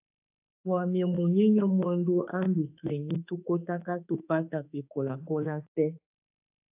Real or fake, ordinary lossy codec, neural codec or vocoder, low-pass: fake; AAC, 32 kbps; autoencoder, 48 kHz, 32 numbers a frame, DAC-VAE, trained on Japanese speech; 3.6 kHz